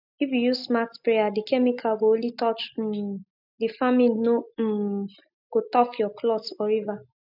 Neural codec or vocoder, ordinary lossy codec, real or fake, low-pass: none; none; real; 5.4 kHz